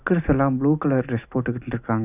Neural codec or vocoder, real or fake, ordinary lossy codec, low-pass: none; real; none; 3.6 kHz